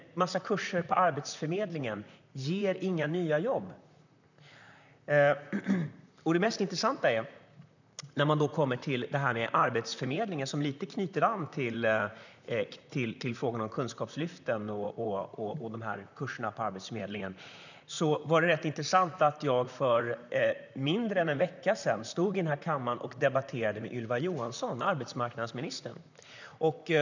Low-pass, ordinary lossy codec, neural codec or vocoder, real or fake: 7.2 kHz; none; vocoder, 44.1 kHz, 128 mel bands, Pupu-Vocoder; fake